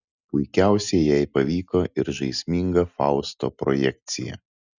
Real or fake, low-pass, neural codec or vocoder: real; 7.2 kHz; none